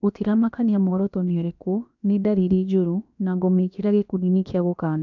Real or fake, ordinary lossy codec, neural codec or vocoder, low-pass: fake; none; codec, 16 kHz, about 1 kbps, DyCAST, with the encoder's durations; 7.2 kHz